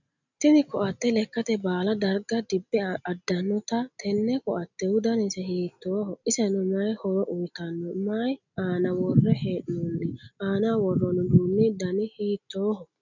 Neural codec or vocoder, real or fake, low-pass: none; real; 7.2 kHz